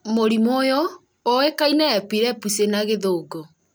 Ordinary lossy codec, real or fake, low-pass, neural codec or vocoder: none; real; none; none